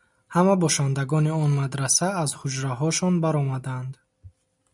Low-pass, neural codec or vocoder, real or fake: 10.8 kHz; none; real